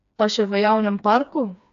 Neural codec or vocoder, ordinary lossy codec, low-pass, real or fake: codec, 16 kHz, 2 kbps, FreqCodec, smaller model; AAC, 96 kbps; 7.2 kHz; fake